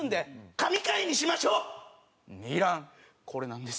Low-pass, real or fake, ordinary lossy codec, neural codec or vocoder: none; real; none; none